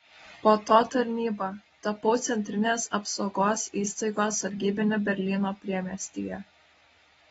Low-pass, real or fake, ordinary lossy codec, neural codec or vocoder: 19.8 kHz; real; AAC, 24 kbps; none